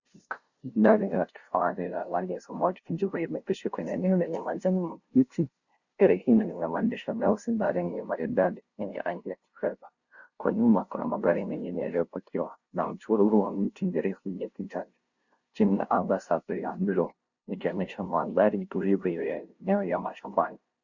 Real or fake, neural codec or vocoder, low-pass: fake; codec, 16 kHz, 0.5 kbps, FunCodec, trained on LibriTTS, 25 frames a second; 7.2 kHz